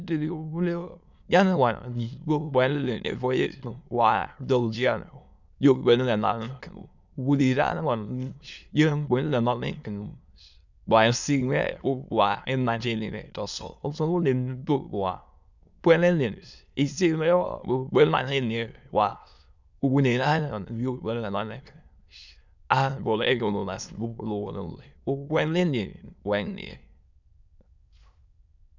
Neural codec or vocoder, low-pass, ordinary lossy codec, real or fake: autoencoder, 22.05 kHz, a latent of 192 numbers a frame, VITS, trained on many speakers; 7.2 kHz; none; fake